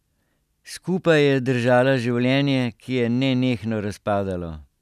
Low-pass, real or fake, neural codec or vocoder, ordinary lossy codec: 14.4 kHz; real; none; none